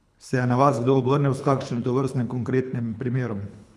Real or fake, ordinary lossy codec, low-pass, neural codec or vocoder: fake; none; none; codec, 24 kHz, 3 kbps, HILCodec